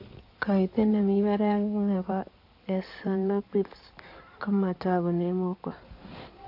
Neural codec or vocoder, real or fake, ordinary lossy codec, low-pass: codec, 16 kHz in and 24 kHz out, 2.2 kbps, FireRedTTS-2 codec; fake; AAC, 32 kbps; 5.4 kHz